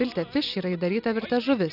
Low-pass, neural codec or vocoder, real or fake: 5.4 kHz; none; real